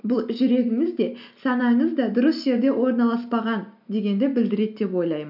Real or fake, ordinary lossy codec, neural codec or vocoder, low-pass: real; none; none; 5.4 kHz